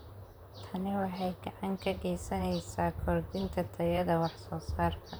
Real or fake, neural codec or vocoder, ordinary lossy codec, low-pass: fake; vocoder, 44.1 kHz, 128 mel bands, Pupu-Vocoder; none; none